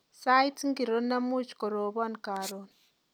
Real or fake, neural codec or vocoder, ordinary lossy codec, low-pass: real; none; none; none